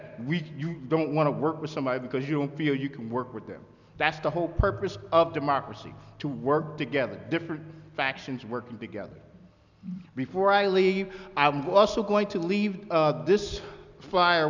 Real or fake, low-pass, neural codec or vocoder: real; 7.2 kHz; none